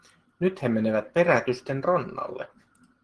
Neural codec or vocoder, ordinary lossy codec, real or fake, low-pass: none; Opus, 16 kbps; real; 10.8 kHz